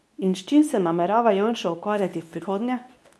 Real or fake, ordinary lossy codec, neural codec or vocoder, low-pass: fake; none; codec, 24 kHz, 0.9 kbps, WavTokenizer, medium speech release version 2; none